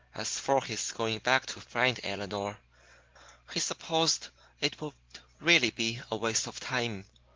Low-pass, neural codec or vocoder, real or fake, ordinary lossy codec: 7.2 kHz; none; real; Opus, 16 kbps